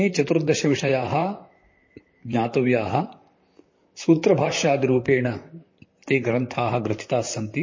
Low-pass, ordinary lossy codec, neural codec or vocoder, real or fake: 7.2 kHz; MP3, 32 kbps; vocoder, 44.1 kHz, 128 mel bands, Pupu-Vocoder; fake